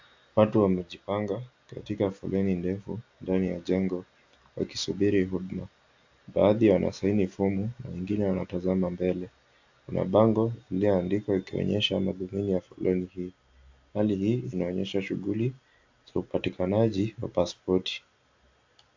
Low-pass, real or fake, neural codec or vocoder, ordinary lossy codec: 7.2 kHz; real; none; AAC, 48 kbps